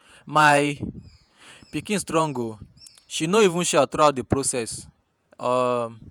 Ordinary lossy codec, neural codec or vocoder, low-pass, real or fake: none; vocoder, 48 kHz, 128 mel bands, Vocos; none; fake